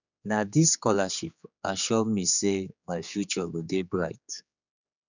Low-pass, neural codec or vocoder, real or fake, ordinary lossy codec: 7.2 kHz; codec, 16 kHz, 4 kbps, X-Codec, HuBERT features, trained on general audio; fake; none